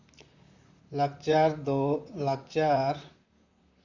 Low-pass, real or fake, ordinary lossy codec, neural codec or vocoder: 7.2 kHz; fake; none; vocoder, 44.1 kHz, 128 mel bands, Pupu-Vocoder